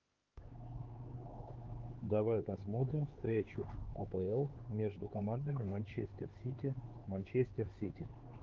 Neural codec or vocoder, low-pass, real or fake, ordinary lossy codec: codec, 16 kHz, 4 kbps, X-Codec, HuBERT features, trained on LibriSpeech; 7.2 kHz; fake; Opus, 16 kbps